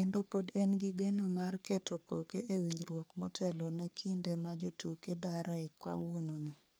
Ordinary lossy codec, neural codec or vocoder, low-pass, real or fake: none; codec, 44.1 kHz, 3.4 kbps, Pupu-Codec; none; fake